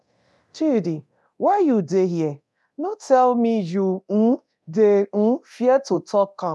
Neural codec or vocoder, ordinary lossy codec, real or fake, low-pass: codec, 24 kHz, 0.9 kbps, DualCodec; none; fake; none